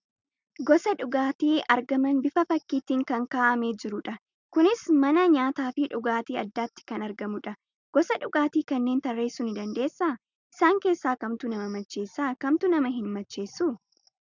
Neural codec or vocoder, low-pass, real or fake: none; 7.2 kHz; real